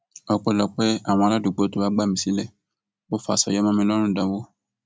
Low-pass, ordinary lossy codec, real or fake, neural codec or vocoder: none; none; real; none